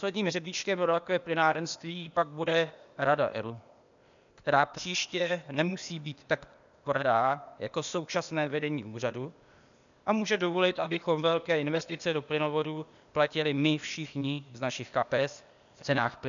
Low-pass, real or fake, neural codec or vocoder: 7.2 kHz; fake; codec, 16 kHz, 0.8 kbps, ZipCodec